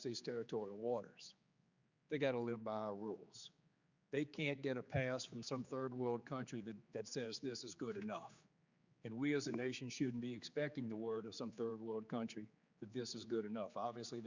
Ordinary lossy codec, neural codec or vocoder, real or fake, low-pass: Opus, 64 kbps; codec, 16 kHz, 4 kbps, X-Codec, HuBERT features, trained on general audio; fake; 7.2 kHz